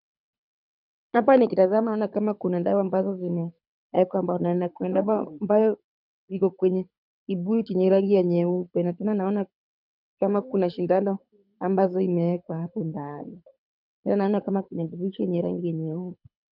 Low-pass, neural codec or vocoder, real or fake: 5.4 kHz; codec, 24 kHz, 6 kbps, HILCodec; fake